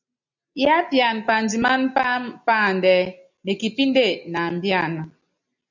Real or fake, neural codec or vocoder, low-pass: real; none; 7.2 kHz